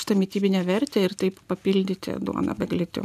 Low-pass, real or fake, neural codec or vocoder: 14.4 kHz; real; none